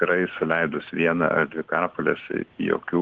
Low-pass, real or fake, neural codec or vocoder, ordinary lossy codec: 7.2 kHz; real; none; Opus, 16 kbps